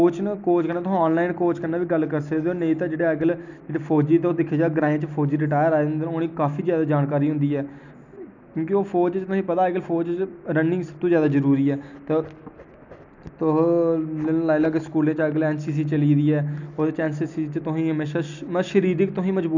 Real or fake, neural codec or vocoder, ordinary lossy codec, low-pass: real; none; none; 7.2 kHz